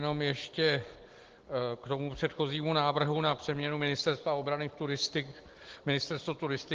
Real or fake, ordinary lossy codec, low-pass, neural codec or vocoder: real; Opus, 16 kbps; 7.2 kHz; none